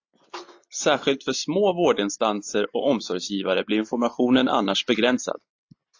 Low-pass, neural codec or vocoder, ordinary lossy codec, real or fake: 7.2 kHz; none; AAC, 48 kbps; real